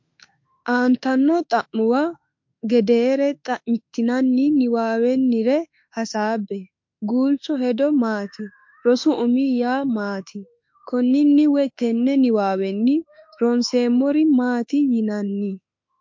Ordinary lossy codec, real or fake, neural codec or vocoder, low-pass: MP3, 48 kbps; fake; autoencoder, 48 kHz, 32 numbers a frame, DAC-VAE, trained on Japanese speech; 7.2 kHz